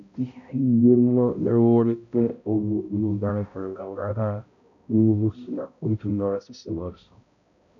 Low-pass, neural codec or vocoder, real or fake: 7.2 kHz; codec, 16 kHz, 0.5 kbps, X-Codec, HuBERT features, trained on balanced general audio; fake